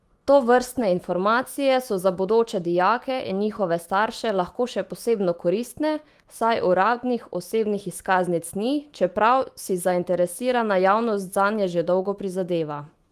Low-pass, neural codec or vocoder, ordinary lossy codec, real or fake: 14.4 kHz; autoencoder, 48 kHz, 128 numbers a frame, DAC-VAE, trained on Japanese speech; Opus, 24 kbps; fake